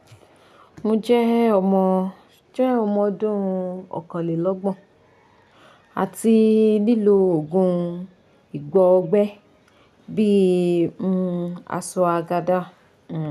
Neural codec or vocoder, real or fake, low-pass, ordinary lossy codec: none; real; 14.4 kHz; none